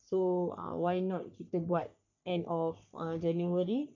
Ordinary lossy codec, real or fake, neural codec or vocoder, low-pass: MP3, 64 kbps; fake; codec, 44.1 kHz, 3.4 kbps, Pupu-Codec; 7.2 kHz